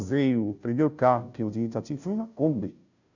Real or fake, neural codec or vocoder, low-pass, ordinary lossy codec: fake; codec, 16 kHz, 0.5 kbps, FunCodec, trained on Chinese and English, 25 frames a second; 7.2 kHz; none